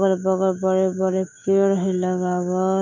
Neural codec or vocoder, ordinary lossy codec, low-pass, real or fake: autoencoder, 48 kHz, 128 numbers a frame, DAC-VAE, trained on Japanese speech; none; 7.2 kHz; fake